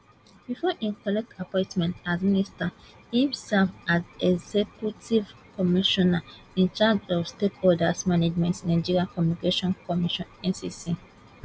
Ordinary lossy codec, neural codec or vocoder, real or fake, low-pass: none; none; real; none